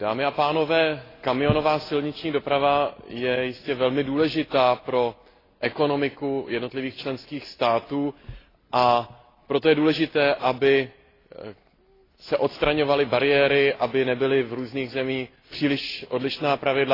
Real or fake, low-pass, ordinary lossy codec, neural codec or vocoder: real; 5.4 kHz; AAC, 24 kbps; none